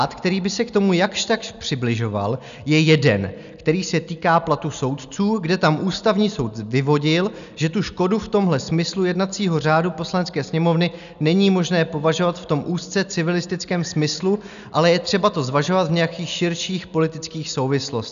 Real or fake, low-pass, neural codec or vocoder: real; 7.2 kHz; none